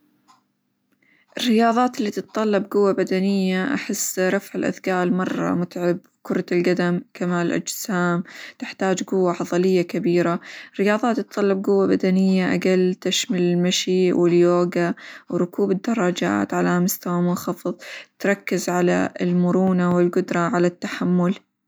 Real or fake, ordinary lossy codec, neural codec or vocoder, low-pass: real; none; none; none